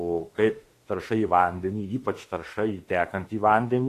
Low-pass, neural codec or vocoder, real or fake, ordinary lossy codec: 14.4 kHz; autoencoder, 48 kHz, 32 numbers a frame, DAC-VAE, trained on Japanese speech; fake; AAC, 48 kbps